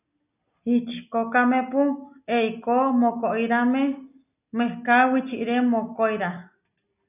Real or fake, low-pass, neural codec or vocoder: real; 3.6 kHz; none